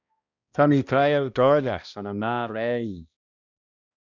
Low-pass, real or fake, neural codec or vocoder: 7.2 kHz; fake; codec, 16 kHz, 1 kbps, X-Codec, HuBERT features, trained on balanced general audio